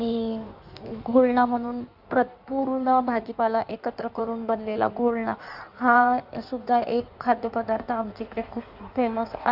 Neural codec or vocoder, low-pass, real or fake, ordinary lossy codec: codec, 16 kHz in and 24 kHz out, 1.1 kbps, FireRedTTS-2 codec; 5.4 kHz; fake; none